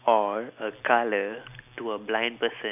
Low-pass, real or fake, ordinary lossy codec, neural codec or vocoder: 3.6 kHz; real; none; none